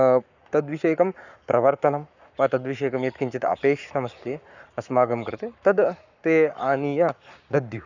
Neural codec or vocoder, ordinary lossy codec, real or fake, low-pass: codec, 44.1 kHz, 7.8 kbps, Pupu-Codec; none; fake; 7.2 kHz